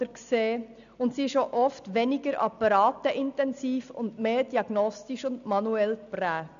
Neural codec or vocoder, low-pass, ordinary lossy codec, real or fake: none; 7.2 kHz; none; real